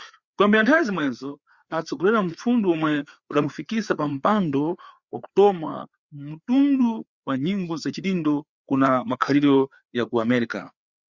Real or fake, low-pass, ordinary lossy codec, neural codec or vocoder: fake; 7.2 kHz; Opus, 64 kbps; codec, 16 kHz, 4 kbps, FreqCodec, larger model